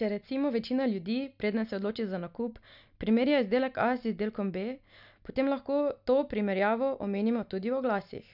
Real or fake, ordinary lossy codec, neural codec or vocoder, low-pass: real; none; none; 5.4 kHz